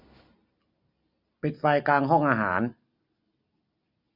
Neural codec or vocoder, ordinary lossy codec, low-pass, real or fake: none; none; 5.4 kHz; real